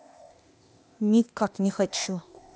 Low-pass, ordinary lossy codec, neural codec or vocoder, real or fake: none; none; codec, 16 kHz, 0.8 kbps, ZipCodec; fake